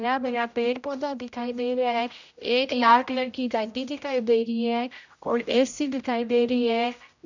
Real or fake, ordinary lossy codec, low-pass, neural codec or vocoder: fake; none; 7.2 kHz; codec, 16 kHz, 0.5 kbps, X-Codec, HuBERT features, trained on general audio